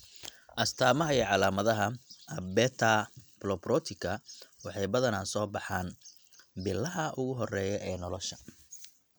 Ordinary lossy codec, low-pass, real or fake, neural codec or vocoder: none; none; real; none